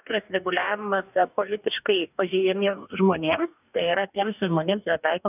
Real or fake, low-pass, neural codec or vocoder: fake; 3.6 kHz; codec, 44.1 kHz, 2.6 kbps, DAC